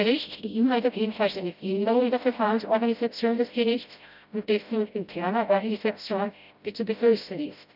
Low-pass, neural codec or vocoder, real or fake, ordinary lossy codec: 5.4 kHz; codec, 16 kHz, 0.5 kbps, FreqCodec, smaller model; fake; none